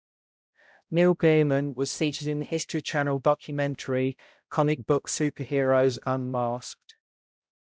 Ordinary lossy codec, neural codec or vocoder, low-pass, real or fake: none; codec, 16 kHz, 0.5 kbps, X-Codec, HuBERT features, trained on balanced general audio; none; fake